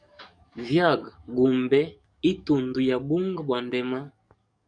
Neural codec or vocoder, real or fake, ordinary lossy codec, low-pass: codec, 44.1 kHz, 7.8 kbps, DAC; fake; MP3, 96 kbps; 9.9 kHz